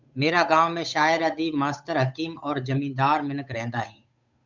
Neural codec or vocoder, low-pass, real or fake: codec, 16 kHz, 8 kbps, FunCodec, trained on Chinese and English, 25 frames a second; 7.2 kHz; fake